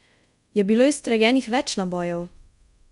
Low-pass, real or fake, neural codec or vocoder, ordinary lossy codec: 10.8 kHz; fake; codec, 24 kHz, 0.5 kbps, DualCodec; none